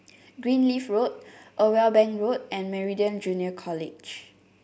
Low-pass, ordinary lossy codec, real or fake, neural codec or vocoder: none; none; real; none